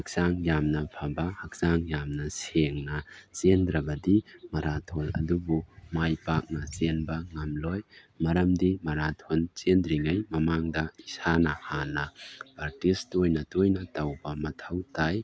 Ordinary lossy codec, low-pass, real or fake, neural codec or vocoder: none; none; real; none